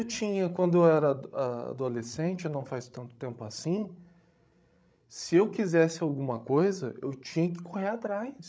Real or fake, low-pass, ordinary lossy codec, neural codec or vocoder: fake; none; none; codec, 16 kHz, 16 kbps, FreqCodec, larger model